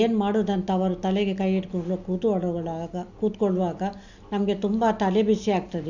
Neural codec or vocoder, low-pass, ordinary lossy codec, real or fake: none; 7.2 kHz; none; real